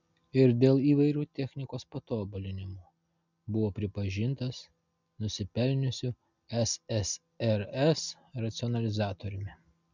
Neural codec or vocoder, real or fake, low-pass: none; real; 7.2 kHz